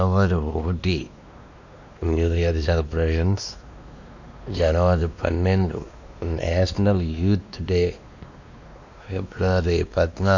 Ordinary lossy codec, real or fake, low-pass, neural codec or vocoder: none; fake; 7.2 kHz; codec, 16 kHz, 2 kbps, X-Codec, WavLM features, trained on Multilingual LibriSpeech